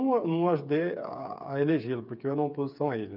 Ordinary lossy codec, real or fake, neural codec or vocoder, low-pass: none; fake; codec, 16 kHz, 8 kbps, FreqCodec, smaller model; 5.4 kHz